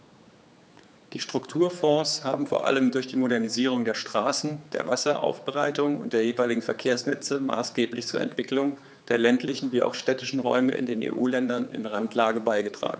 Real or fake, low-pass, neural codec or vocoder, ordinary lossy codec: fake; none; codec, 16 kHz, 4 kbps, X-Codec, HuBERT features, trained on general audio; none